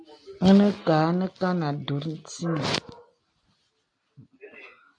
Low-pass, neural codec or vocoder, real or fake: 9.9 kHz; none; real